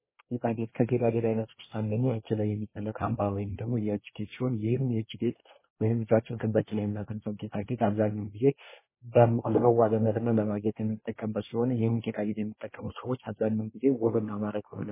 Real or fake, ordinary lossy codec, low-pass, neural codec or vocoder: fake; MP3, 16 kbps; 3.6 kHz; codec, 24 kHz, 1 kbps, SNAC